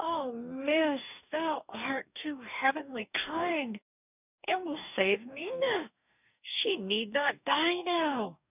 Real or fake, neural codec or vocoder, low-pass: fake; codec, 44.1 kHz, 2.6 kbps, DAC; 3.6 kHz